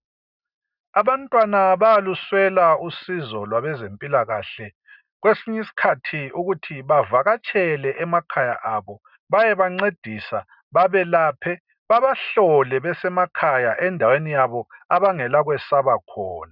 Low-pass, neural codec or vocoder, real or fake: 5.4 kHz; none; real